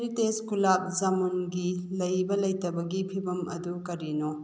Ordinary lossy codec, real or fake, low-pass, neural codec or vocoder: none; real; none; none